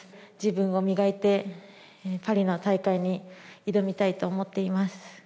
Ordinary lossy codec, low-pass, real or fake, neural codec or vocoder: none; none; real; none